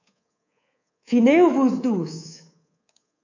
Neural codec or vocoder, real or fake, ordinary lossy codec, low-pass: codec, 24 kHz, 3.1 kbps, DualCodec; fake; AAC, 48 kbps; 7.2 kHz